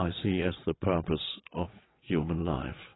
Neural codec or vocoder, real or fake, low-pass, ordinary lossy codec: vocoder, 22.05 kHz, 80 mel bands, WaveNeXt; fake; 7.2 kHz; AAC, 16 kbps